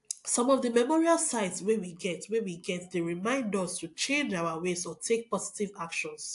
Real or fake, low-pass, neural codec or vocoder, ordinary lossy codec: real; 10.8 kHz; none; AAC, 64 kbps